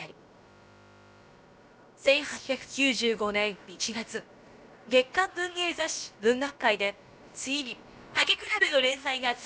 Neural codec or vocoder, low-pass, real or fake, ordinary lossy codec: codec, 16 kHz, about 1 kbps, DyCAST, with the encoder's durations; none; fake; none